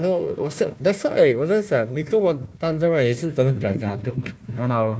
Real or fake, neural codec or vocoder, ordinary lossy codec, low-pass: fake; codec, 16 kHz, 1 kbps, FunCodec, trained on Chinese and English, 50 frames a second; none; none